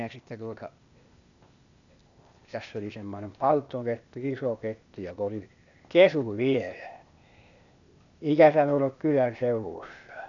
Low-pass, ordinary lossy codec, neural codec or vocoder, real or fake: 7.2 kHz; none; codec, 16 kHz, 0.8 kbps, ZipCodec; fake